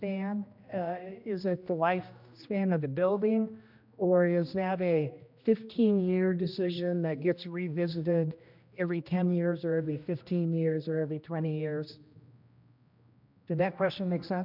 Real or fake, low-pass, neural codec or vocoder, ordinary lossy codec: fake; 5.4 kHz; codec, 16 kHz, 1 kbps, X-Codec, HuBERT features, trained on general audio; MP3, 48 kbps